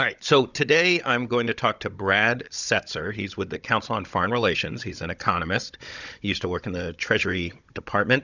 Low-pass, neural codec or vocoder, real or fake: 7.2 kHz; codec, 16 kHz, 16 kbps, FunCodec, trained on Chinese and English, 50 frames a second; fake